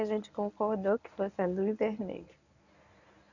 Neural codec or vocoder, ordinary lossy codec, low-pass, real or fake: codec, 24 kHz, 0.9 kbps, WavTokenizer, medium speech release version 1; none; 7.2 kHz; fake